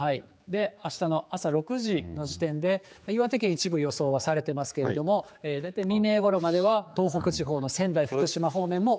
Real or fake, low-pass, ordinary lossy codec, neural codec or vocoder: fake; none; none; codec, 16 kHz, 4 kbps, X-Codec, HuBERT features, trained on general audio